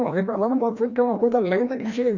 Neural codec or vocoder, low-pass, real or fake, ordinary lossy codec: codec, 16 kHz, 1 kbps, FreqCodec, larger model; 7.2 kHz; fake; none